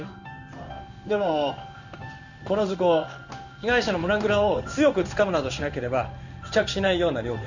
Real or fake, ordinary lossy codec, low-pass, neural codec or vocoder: fake; none; 7.2 kHz; codec, 16 kHz in and 24 kHz out, 1 kbps, XY-Tokenizer